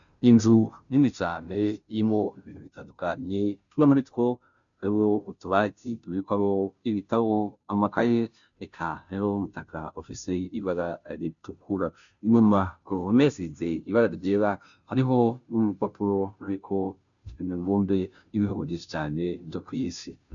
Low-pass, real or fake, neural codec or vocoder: 7.2 kHz; fake; codec, 16 kHz, 0.5 kbps, FunCodec, trained on Chinese and English, 25 frames a second